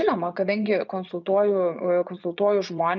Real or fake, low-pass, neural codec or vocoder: fake; 7.2 kHz; vocoder, 24 kHz, 100 mel bands, Vocos